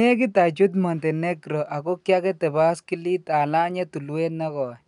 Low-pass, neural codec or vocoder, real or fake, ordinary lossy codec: 10.8 kHz; none; real; none